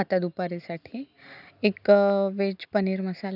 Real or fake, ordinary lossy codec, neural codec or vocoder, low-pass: real; none; none; 5.4 kHz